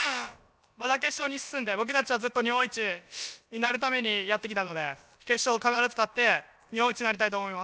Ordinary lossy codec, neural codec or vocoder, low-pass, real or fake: none; codec, 16 kHz, about 1 kbps, DyCAST, with the encoder's durations; none; fake